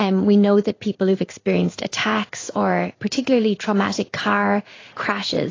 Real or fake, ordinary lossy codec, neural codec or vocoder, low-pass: fake; AAC, 32 kbps; codec, 16 kHz in and 24 kHz out, 1 kbps, XY-Tokenizer; 7.2 kHz